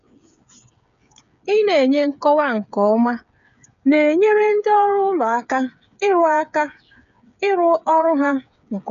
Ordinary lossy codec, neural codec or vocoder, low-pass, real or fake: none; codec, 16 kHz, 8 kbps, FreqCodec, smaller model; 7.2 kHz; fake